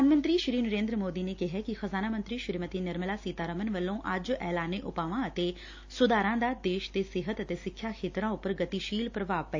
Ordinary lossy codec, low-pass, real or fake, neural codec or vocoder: AAC, 48 kbps; 7.2 kHz; real; none